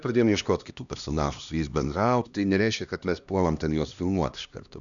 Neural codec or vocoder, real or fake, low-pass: codec, 16 kHz, 1 kbps, X-Codec, HuBERT features, trained on LibriSpeech; fake; 7.2 kHz